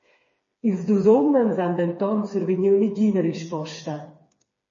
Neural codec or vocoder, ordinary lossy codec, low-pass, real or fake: codec, 16 kHz, 4 kbps, FreqCodec, smaller model; MP3, 32 kbps; 7.2 kHz; fake